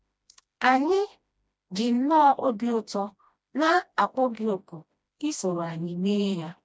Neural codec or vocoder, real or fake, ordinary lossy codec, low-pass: codec, 16 kHz, 1 kbps, FreqCodec, smaller model; fake; none; none